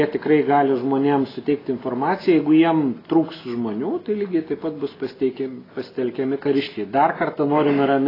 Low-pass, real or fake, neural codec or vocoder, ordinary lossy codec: 5.4 kHz; real; none; AAC, 24 kbps